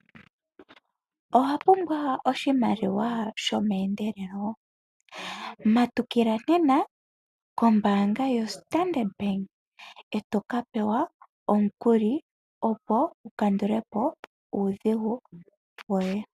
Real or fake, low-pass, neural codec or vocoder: real; 14.4 kHz; none